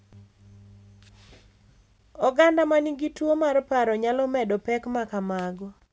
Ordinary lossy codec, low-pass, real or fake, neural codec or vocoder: none; none; real; none